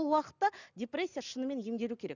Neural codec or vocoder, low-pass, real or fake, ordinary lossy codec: none; 7.2 kHz; real; none